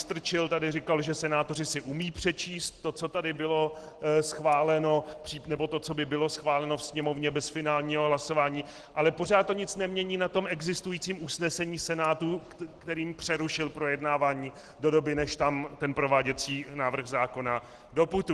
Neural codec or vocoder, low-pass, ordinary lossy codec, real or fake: none; 10.8 kHz; Opus, 16 kbps; real